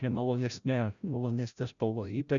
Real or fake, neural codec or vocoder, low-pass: fake; codec, 16 kHz, 0.5 kbps, FreqCodec, larger model; 7.2 kHz